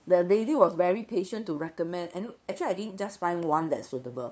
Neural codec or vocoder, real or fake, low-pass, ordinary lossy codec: codec, 16 kHz, 2 kbps, FunCodec, trained on LibriTTS, 25 frames a second; fake; none; none